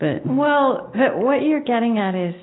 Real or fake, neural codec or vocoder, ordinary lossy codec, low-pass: real; none; AAC, 16 kbps; 7.2 kHz